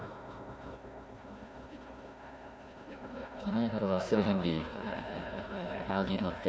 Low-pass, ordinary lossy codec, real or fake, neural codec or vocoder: none; none; fake; codec, 16 kHz, 1 kbps, FunCodec, trained on Chinese and English, 50 frames a second